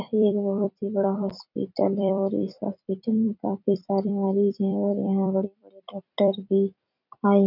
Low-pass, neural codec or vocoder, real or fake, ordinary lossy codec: 5.4 kHz; vocoder, 44.1 kHz, 128 mel bands every 256 samples, BigVGAN v2; fake; none